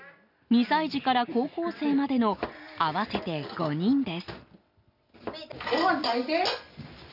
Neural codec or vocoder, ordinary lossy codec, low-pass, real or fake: none; none; 5.4 kHz; real